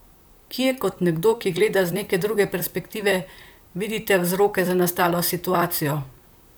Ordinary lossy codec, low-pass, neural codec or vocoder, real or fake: none; none; vocoder, 44.1 kHz, 128 mel bands, Pupu-Vocoder; fake